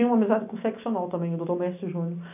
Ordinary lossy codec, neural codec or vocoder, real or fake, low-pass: none; none; real; 3.6 kHz